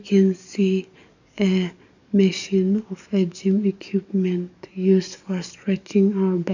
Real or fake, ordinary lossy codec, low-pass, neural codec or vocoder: fake; none; 7.2 kHz; codec, 44.1 kHz, 7.8 kbps, DAC